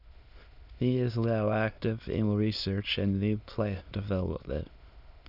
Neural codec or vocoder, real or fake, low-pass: autoencoder, 22.05 kHz, a latent of 192 numbers a frame, VITS, trained on many speakers; fake; 5.4 kHz